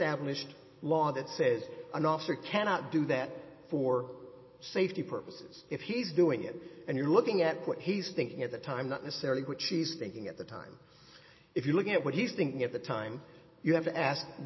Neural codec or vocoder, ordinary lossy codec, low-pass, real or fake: none; MP3, 24 kbps; 7.2 kHz; real